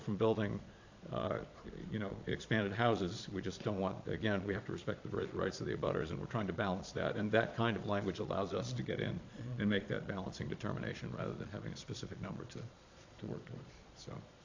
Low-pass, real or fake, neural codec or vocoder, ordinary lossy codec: 7.2 kHz; fake; vocoder, 22.05 kHz, 80 mel bands, Vocos; AAC, 48 kbps